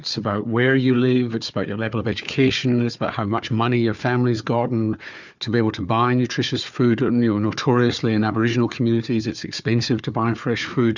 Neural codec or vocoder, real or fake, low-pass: codec, 16 kHz, 4 kbps, FunCodec, trained on Chinese and English, 50 frames a second; fake; 7.2 kHz